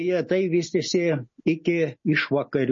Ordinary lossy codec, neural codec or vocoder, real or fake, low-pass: MP3, 32 kbps; none; real; 7.2 kHz